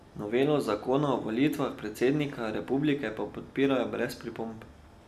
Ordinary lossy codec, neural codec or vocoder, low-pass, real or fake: none; none; 14.4 kHz; real